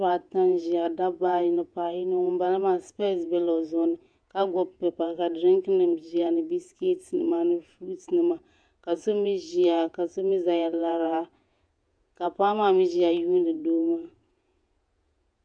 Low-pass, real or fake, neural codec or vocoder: 9.9 kHz; fake; vocoder, 48 kHz, 128 mel bands, Vocos